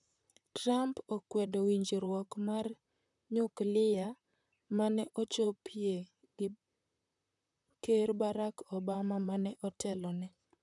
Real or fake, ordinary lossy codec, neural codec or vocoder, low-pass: fake; none; vocoder, 44.1 kHz, 128 mel bands, Pupu-Vocoder; 10.8 kHz